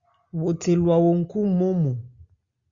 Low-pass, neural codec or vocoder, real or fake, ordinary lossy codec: 7.2 kHz; none; real; Opus, 64 kbps